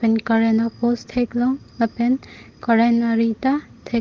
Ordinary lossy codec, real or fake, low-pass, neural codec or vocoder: Opus, 16 kbps; real; 7.2 kHz; none